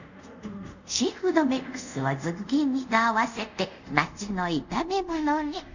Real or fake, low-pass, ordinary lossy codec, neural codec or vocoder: fake; 7.2 kHz; none; codec, 24 kHz, 0.5 kbps, DualCodec